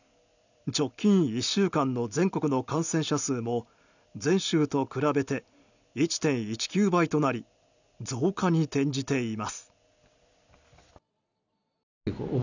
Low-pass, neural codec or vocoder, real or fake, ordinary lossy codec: 7.2 kHz; none; real; none